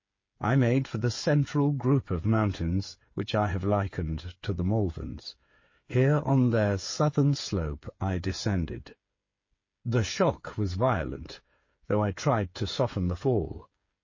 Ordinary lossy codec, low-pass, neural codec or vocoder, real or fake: MP3, 32 kbps; 7.2 kHz; codec, 16 kHz, 8 kbps, FreqCodec, smaller model; fake